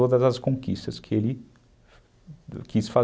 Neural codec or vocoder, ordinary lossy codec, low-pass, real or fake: none; none; none; real